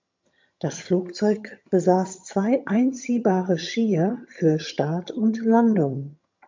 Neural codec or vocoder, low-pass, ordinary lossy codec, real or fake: vocoder, 22.05 kHz, 80 mel bands, HiFi-GAN; 7.2 kHz; MP3, 64 kbps; fake